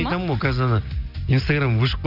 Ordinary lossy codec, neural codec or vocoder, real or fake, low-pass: none; none; real; 5.4 kHz